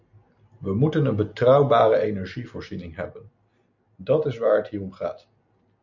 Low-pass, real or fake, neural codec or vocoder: 7.2 kHz; real; none